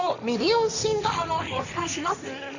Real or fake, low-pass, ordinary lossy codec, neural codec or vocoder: fake; 7.2 kHz; none; codec, 16 kHz, 1.1 kbps, Voila-Tokenizer